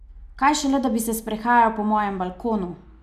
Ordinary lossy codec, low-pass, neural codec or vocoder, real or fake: none; 14.4 kHz; none; real